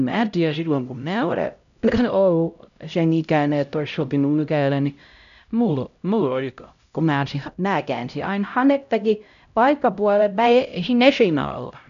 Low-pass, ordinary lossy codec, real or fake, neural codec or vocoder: 7.2 kHz; none; fake; codec, 16 kHz, 0.5 kbps, X-Codec, HuBERT features, trained on LibriSpeech